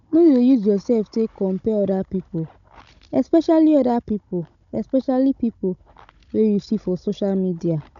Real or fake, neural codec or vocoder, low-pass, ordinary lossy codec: fake; codec, 16 kHz, 16 kbps, FunCodec, trained on Chinese and English, 50 frames a second; 7.2 kHz; none